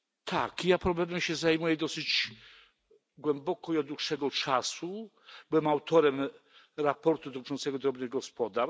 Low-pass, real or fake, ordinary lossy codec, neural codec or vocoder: none; real; none; none